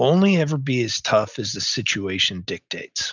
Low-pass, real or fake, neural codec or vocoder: 7.2 kHz; real; none